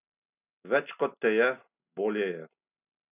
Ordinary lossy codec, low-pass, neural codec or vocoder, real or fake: none; 3.6 kHz; none; real